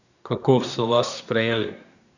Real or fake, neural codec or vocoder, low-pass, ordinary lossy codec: fake; codec, 24 kHz, 1 kbps, SNAC; 7.2 kHz; none